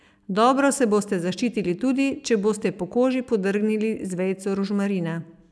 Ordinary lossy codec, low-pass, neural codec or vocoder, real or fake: none; none; none; real